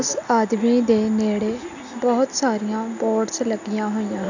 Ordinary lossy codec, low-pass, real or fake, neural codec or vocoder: none; 7.2 kHz; real; none